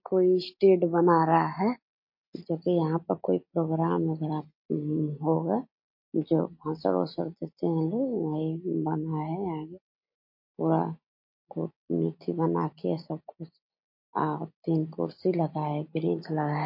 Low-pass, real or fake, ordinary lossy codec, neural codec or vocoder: 5.4 kHz; real; MP3, 32 kbps; none